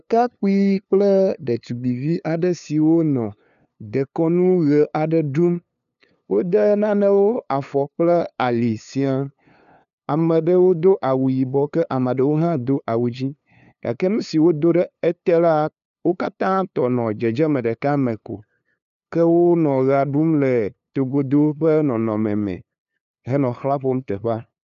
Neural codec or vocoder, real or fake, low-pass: codec, 16 kHz, 2 kbps, FunCodec, trained on LibriTTS, 25 frames a second; fake; 7.2 kHz